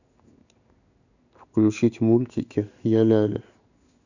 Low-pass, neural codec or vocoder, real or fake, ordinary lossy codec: 7.2 kHz; codec, 16 kHz in and 24 kHz out, 1 kbps, XY-Tokenizer; fake; none